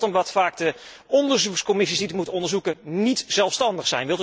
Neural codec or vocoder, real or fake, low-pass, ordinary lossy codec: none; real; none; none